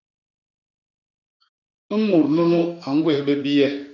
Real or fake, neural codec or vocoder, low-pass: fake; autoencoder, 48 kHz, 32 numbers a frame, DAC-VAE, trained on Japanese speech; 7.2 kHz